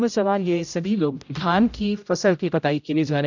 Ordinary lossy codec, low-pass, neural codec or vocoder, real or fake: none; 7.2 kHz; codec, 16 kHz, 0.5 kbps, X-Codec, HuBERT features, trained on general audio; fake